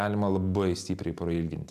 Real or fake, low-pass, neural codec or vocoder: real; 14.4 kHz; none